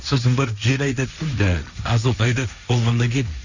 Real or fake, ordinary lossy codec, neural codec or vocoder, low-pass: fake; none; codec, 16 kHz, 1.1 kbps, Voila-Tokenizer; 7.2 kHz